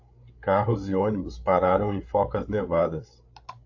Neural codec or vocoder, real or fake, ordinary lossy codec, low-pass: codec, 16 kHz, 8 kbps, FreqCodec, larger model; fake; AAC, 48 kbps; 7.2 kHz